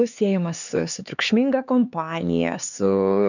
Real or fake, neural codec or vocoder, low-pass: fake; codec, 16 kHz, 4 kbps, X-Codec, HuBERT features, trained on LibriSpeech; 7.2 kHz